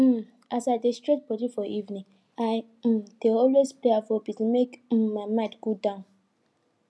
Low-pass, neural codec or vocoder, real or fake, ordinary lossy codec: none; none; real; none